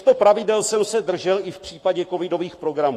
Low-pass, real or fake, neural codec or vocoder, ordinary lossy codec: 14.4 kHz; fake; codec, 44.1 kHz, 7.8 kbps, Pupu-Codec; AAC, 48 kbps